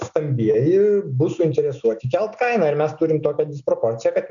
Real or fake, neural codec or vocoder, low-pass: real; none; 7.2 kHz